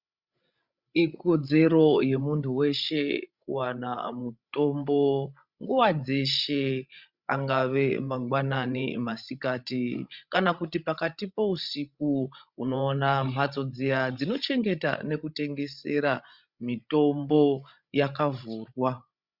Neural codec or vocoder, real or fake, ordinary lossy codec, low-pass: codec, 16 kHz, 16 kbps, FreqCodec, larger model; fake; Opus, 64 kbps; 5.4 kHz